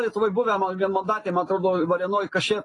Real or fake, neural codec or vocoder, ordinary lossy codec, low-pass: real; none; AAC, 32 kbps; 10.8 kHz